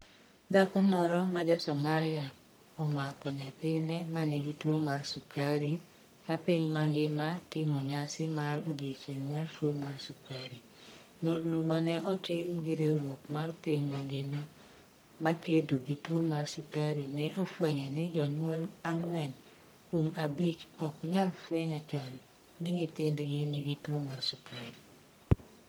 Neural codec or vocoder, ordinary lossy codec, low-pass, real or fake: codec, 44.1 kHz, 1.7 kbps, Pupu-Codec; none; none; fake